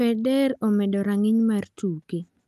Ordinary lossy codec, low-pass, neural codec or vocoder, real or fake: Opus, 32 kbps; 14.4 kHz; none; real